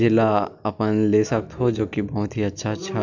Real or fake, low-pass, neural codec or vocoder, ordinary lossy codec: real; 7.2 kHz; none; none